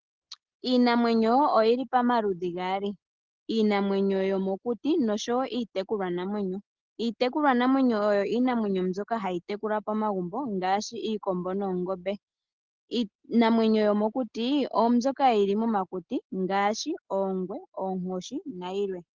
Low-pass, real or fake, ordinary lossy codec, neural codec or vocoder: 7.2 kHz; real; Opus, 16 kbps; none